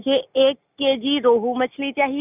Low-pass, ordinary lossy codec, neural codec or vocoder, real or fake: 3.6 kHz; none; none; real